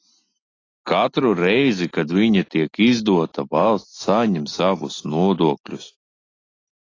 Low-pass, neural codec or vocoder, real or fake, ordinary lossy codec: 7.2 kHz; none; real; AAC, 32 kbps